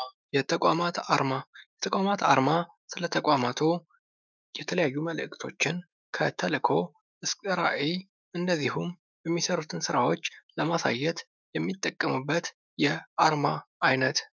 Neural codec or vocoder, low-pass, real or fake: autoencoder, 48 kHz, 128 numbers a frame, DAC-VAE, trained on Japanese speech; 7.2 kHz; fake